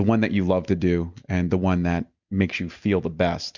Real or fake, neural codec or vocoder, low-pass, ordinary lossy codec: real; none; 7.2 kHz; Opus, 64 kbps